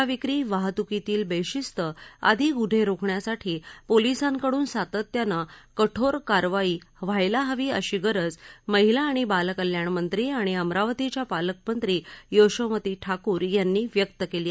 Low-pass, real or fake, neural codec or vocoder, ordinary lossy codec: none; real; none; none